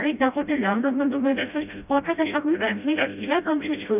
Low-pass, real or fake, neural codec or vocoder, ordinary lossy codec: 3.6 kHz; fake; codec, 16 kHz, 0.5 kbps, FreqCodec, smaller model; none